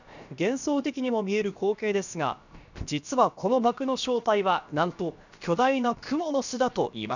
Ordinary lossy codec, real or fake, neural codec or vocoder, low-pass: none; fake; codec, 16 kHz, about 1 kbps, DyCAST, with the encoder's durations; 7.2 kHz